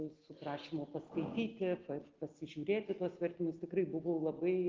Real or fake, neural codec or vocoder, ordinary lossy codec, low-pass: real; none; Opus, 16 kbps; 7.2 kHz